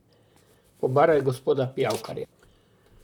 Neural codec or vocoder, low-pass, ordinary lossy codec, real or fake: vocoder, 44.1 kHz, 128 mel bands, Pupu-Vocoder; 19.8 kHz; none; fake